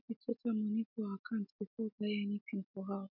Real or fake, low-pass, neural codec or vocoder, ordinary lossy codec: real; 5.4 kHz; none; MP3, 48 kbps